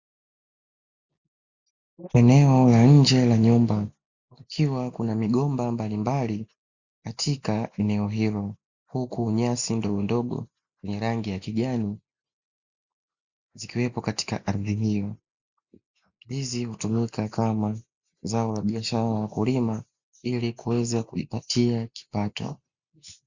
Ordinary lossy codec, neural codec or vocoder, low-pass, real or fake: Opus, 64 kbps; none; 7.2 kHz; real